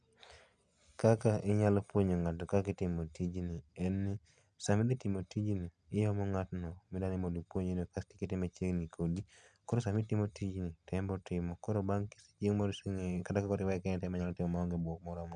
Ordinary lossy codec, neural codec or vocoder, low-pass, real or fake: none; none; 9.9 kHz; real